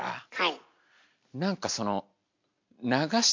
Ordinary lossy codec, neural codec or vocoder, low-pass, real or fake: MP3, 48 kbps; none; 7.2 kHz; real